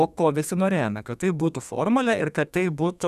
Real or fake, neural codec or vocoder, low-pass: fake; codec, 32 kHz, 1.9 kbps, SNAC; 14.4 kHz